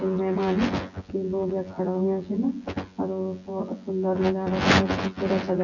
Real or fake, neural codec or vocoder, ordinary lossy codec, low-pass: fake; vocoder, 24 kHz, 100 mel bands, Vocos; none; 7.2 kHz